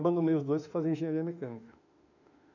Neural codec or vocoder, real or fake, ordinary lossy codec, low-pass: autoencoder, 48 kHz, 32 numbers a frame, DAC-VAE, trained on Japanese speech; fake; none; 7.2 kHz